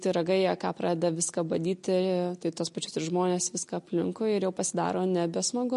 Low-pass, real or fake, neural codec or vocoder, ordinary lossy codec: 14.4 kHz; real; none; MP3, 48 kbps